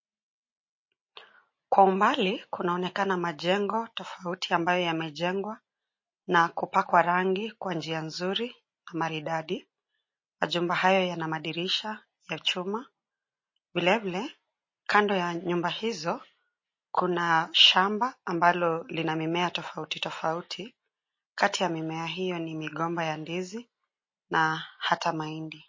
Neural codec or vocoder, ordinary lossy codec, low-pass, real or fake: none; MP3, 32 kbps; 7.2 kHz; real